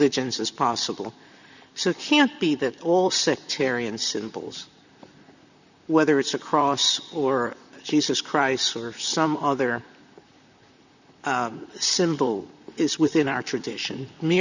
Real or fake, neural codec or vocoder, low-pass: fake; vocoder, 44.1 kHz, 128 mel bands, Pupu-Vocoder; 7.2 kHz